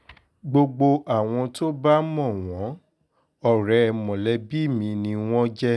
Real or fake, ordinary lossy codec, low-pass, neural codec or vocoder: real; none; none; none